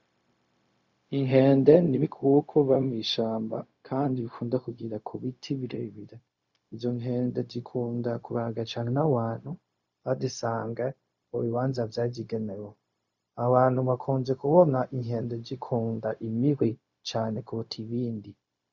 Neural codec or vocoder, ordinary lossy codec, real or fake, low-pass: codec, 16 kHz, 0.4 kbps, LongCat-Audio-Codec; Opus, 64 kbps; fake; 7.2 kHz